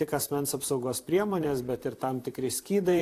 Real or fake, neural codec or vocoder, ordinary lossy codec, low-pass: fake; vocoder, 44.1 kHz, 128 mel bands, Pupu-Vocoder; AAC, 64 kbps; 14.4 kHz